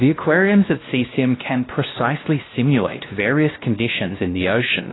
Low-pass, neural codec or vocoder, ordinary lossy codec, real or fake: 7.2 kHz; codec, 16 kHz, 1 kbps, X-Codec, WavLM features, trained on Multilingual LibriSpeech; AAC, 16 kbps; fake